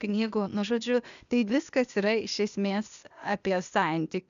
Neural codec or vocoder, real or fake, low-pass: codec, 16 kHz, 0.8 kbps, ZipCodec; fake; 7.2 kHz